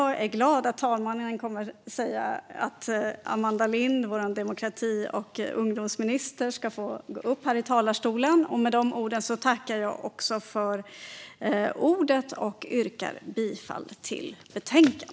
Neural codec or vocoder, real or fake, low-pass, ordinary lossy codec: none; real; none; none